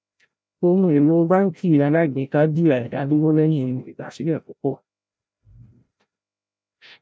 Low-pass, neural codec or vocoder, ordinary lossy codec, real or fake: none; codec, 16 kHz, 0.5 kbps, FreqCodec, larger model; none; fake